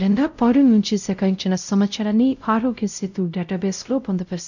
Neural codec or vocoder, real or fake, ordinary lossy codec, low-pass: codec, 16 kHz, 0.5 kbps, X-Codec, WavLM features, trained on Multilingual LibriSpeech; fake; none; 7.2 kHz